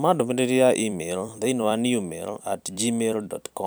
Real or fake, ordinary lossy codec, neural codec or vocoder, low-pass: fake; none; vocoder, 44.1 kHz, 128 mel bands every 256 samples, BigVGAN v2; none